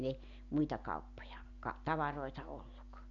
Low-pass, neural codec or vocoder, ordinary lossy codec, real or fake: 7.2 kHz; none; MP3, 96 kbps; real